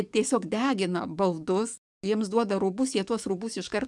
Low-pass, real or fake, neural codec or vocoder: 10.8 kHz; fake; codec, 44.1 kHz, 7.8 kbps, DAC